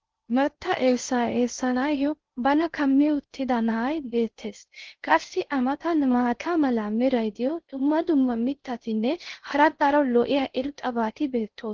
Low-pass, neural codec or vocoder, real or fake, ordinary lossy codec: 7.2 kHz; codec, 16 kHz in and 24 kHz out, 0.6 kbps, FocalCodec, streaming, 2048 codes; fake; Opus, 16 kbps